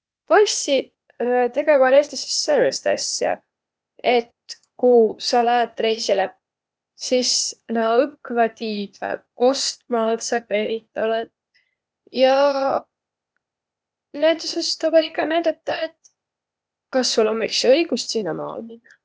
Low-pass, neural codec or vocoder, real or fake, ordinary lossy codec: none; codec, 16 kHz, 0.8 kbps, ZipCodec; fake; none